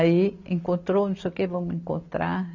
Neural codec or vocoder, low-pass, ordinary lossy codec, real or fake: none; 7.2 kHz; none; real